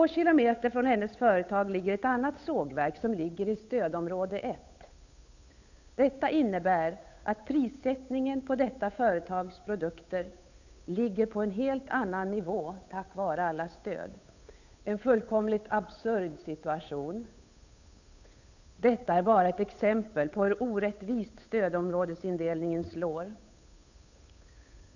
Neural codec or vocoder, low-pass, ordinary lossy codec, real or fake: codec, 16 kHz, 8 kbps, FunCodec, trained on Chinese and English, 25 frames a second; 7.2 kHz; none; fake